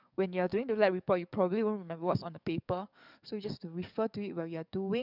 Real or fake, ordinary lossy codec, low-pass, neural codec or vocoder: fake; none; 5.4 kHz; codec, 44.1 kHz, 7.8 kbps, DAC